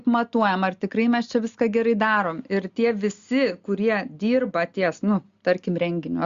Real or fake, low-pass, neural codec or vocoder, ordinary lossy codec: real; 7.2 kHz; none; Opus, 64 kbps